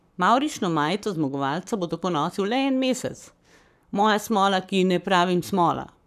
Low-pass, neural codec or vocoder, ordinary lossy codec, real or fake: 14.4 kHz; codec, 44.1 kHz, 7.8 kbps, Pupu-Codec; none; fake